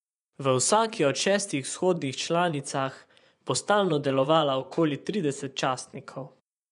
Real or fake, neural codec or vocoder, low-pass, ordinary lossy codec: fake; vocoder, 24 kHz, 100 mel bands, Vocos; 10.8 kHz; none